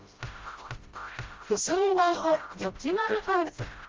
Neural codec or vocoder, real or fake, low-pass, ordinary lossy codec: codec, 16 kHz, 0.5 kbps, FreqCodec, smaller model; fake; 7.2 kHz; Opus, 32 kbps